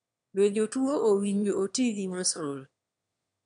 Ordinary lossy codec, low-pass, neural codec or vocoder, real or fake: none; 9.9 kHz; autoencoder, 22.05 kHz, a latent of 192 numbers a frame, VITS, trained on one speaker; fake